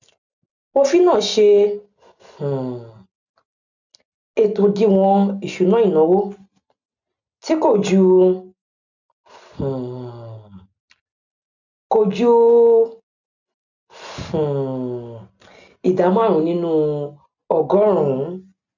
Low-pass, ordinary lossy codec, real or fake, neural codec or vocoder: 7.2 kHz; none; real; none